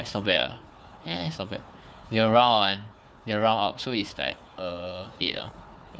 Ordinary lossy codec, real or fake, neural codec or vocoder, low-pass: none; fake; codec, 16 kHz, 4 kbps, FunCodec, trained on Chinese and English, 50 frames a second; none